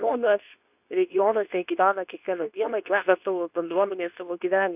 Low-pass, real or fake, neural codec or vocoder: 3.6 kHz; fake; codec, 24 kHz, 0.9 kbps, WavTokenizer, medium speech release version 2